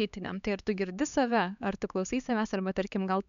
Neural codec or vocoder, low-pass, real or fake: codec, 16 kHz, 4 kbps, X-Codec, HuBERT features, trained on LibriSpeech; 7.2 kHz; fake